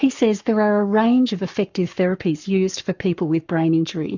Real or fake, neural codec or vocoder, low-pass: fake; codec, 44.1 kHz, 7.8 kbps, Pupu-Codec; 7.2 kHz